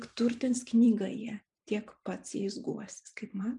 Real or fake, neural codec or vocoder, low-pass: fake; vocoder, 44.1 kHz, 128 mel bands every 512 samples, BigVGAN v2; 10.8 kHz